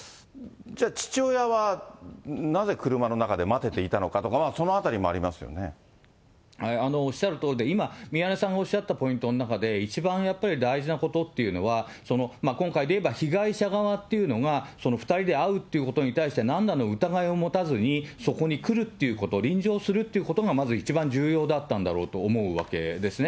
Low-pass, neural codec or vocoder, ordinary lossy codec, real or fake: none; none; none; real